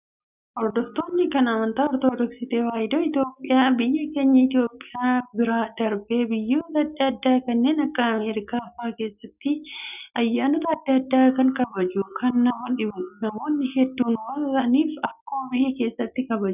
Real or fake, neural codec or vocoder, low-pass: real; none; 3.6 kHz